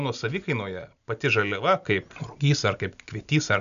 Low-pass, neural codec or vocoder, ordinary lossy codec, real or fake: 7.2 kHz; none; MP3, 96 kbps; real